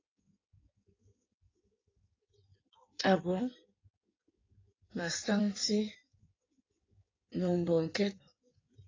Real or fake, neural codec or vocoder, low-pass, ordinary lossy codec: fake; codec, 16 kHz in and 24 kHz out, 1.1 kbps, FireRedTTS-2 codec; 7.2 kHz; AAC, 32 kbps